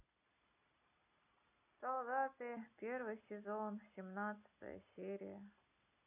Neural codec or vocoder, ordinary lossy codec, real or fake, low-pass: none; none; real; 3.6 kHz